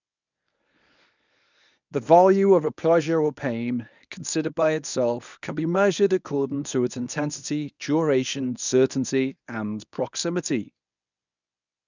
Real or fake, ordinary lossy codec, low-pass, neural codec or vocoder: fake; none; 7.2 kHz; codec, 24 kHz, 0.9 kbps, WavTokenizer, medium speech release version 1